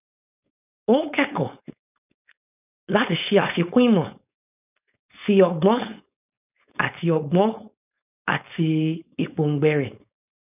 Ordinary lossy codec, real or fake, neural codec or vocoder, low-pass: none; fake; codec, 16 kHz, 4.8 kbps, FACodec; 3.6 kHz